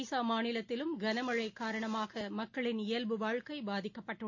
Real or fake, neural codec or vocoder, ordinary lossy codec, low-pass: real; none; AAC, 48 kbps; 7.2 kHz